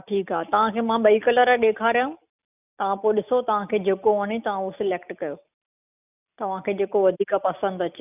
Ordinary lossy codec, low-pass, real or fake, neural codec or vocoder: none; 3.6 kHz; real; none